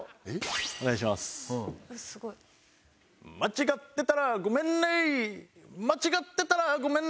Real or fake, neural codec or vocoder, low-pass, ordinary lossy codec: real; none; none; none